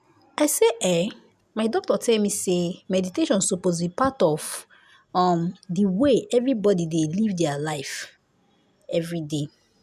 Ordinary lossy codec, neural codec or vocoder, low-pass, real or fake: none; none; 14.4 kHz; real